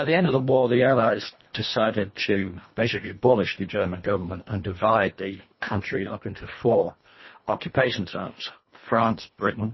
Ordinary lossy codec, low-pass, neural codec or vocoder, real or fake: MP3, 24 kbps; 7.2 kHz; codec, 24 kHz, 1.5 kbps, HILCodec; fake